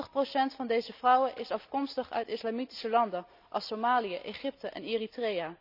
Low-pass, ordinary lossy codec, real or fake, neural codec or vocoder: 5.4 kHz; none; real; none